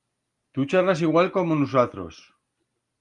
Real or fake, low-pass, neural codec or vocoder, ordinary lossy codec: real; 10.8 kHz; none; Opus, 32 kbps